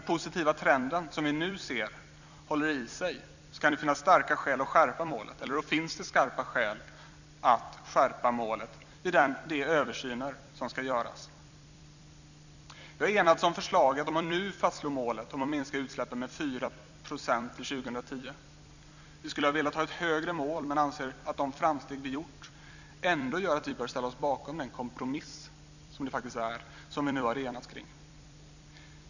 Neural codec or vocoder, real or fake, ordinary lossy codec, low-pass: vocoder, 44.1 kHz, 128 mel bands every 256 samples, BigVGAN v2; fake; none; 7.2 kHz